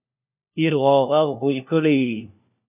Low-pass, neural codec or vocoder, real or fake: 3.6 kHz; codec, 16 kHz, 1 kbps, FunCodec, trained on LibriTTS, 50 frames a second; fake